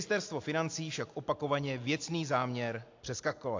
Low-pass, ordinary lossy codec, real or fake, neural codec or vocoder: 7.2 kHz; AAC, 48 kbps; real; none